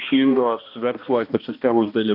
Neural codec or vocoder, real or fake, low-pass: codec, 16 kHz, 1 kbps, X-Codec, HuBERT features, trained on balanced general audio; fake; 5.4 kHz